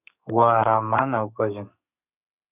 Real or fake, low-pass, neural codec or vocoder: fake; 3.6 kHz; codec, 32 kHz, 1.9 kbps, SNAC